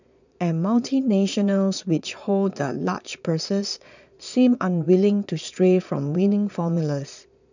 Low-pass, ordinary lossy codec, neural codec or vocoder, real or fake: 7.2 kHz; none; vocoder, 22.05 kHz, 80 mel bands, Vocos; fake